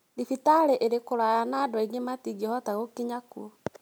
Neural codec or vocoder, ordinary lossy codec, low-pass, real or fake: none; none; none; real